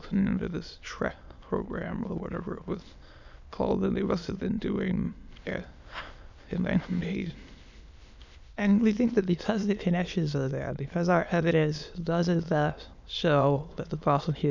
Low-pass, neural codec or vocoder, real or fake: 7.2 kHz; autoencoder, 22.05 kHz, a latent of 192 numbers a frame, VITS, trained on many speakers; fake